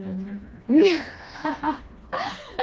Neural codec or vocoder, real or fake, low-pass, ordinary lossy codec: codec, 16 kHz, 2 kbps, FreqCodec, smaller model; fake; none; none